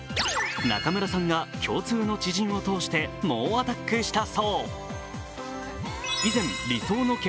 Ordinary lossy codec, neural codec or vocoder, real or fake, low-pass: none; none; real; none